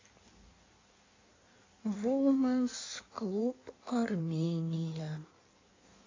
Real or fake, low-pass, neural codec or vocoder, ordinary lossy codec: fake; 7.2 kHz; codec, 16 kHz in and 24 kHz out, 1.1 kbps, FireRedTTS-2 codec; AAC, 32 kbps